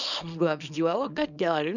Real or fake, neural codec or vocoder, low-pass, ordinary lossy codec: fake; codec, 24 kHz, 0.9 kbps, WavTokenizer, small release; 7.2 kHz; none